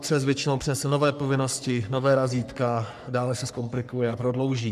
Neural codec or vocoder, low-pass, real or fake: codec, 44.1 kHz, 3.4 kbps, Pupu-Codec; 14.4 kHz; fake